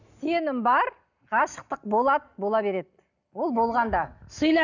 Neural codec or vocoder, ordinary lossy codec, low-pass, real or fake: none; none; 7.2 kHz; real